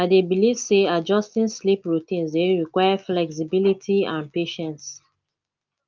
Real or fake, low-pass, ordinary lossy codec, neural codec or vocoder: real; 7.2 kHz; Opus, 24 kbps; none